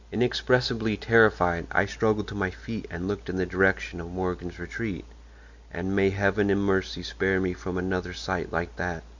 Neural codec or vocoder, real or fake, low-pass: none; real; 7.2 kHz